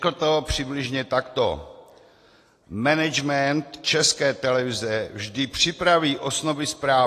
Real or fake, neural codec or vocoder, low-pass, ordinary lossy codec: real; none; 14.4 kHz; AAC, 48 kbps